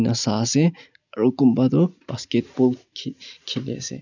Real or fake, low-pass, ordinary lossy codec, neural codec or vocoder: real; 7.2 kHz; none; none